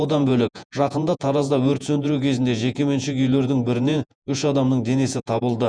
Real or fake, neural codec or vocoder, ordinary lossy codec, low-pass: fake; vocoder, 48 kHz, 128 mel bands, Vocos; none; 9.9 kHz